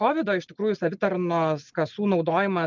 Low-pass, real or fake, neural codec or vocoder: 7.2 kHz; real; none